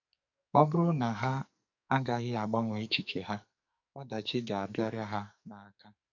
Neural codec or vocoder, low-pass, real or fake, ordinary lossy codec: codec, 44.1 kHz, 2.6 kbps, SNAC; 7.2 kHz; fake; none